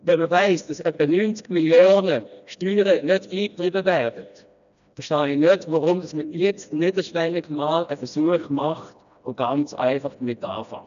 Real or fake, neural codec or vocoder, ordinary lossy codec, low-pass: fake; codec, 16 kHz, 1 kbps, FreqCodec, smaller model; none; 7.2 kHz